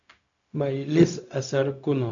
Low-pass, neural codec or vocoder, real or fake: 7.2 kHz; codec, 16 kHz, 0.4 kbps, LongCat-Audio-Codec; fake